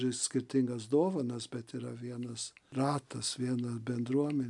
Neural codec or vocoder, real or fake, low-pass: none; real; 10.8 kHz